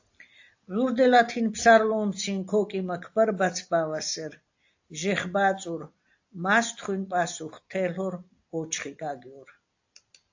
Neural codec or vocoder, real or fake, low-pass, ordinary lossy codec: none; real; 7.2 kHz; AAC, 48 kbps